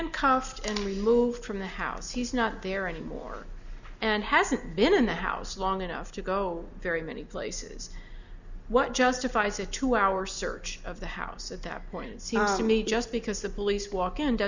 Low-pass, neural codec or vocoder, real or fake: 7.2 kHz; none; real